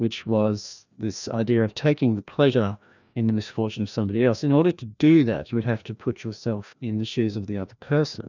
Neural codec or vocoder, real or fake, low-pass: codec, 16 kHz, 1 kbps, FreqCodec, larger model; fake; 7.2 kHz